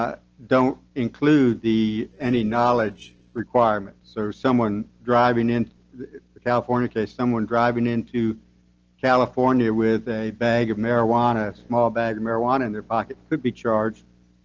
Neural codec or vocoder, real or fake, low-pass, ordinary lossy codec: none; real; 7.2 kHz; Opus, 16 kbps